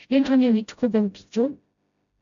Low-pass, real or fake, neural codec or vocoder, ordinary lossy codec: 7.2 kHz; fake; codec, 16 kHz, 0.5 kbps, FreqCodec, smaller model; AAC, 64 kbps